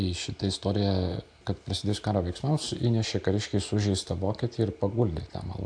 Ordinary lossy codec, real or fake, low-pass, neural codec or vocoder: AAC, 64 kbps; real; 9.9 kHz; none